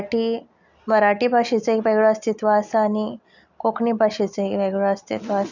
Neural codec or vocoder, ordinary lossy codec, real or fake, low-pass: none; none; real; 7.2 kHz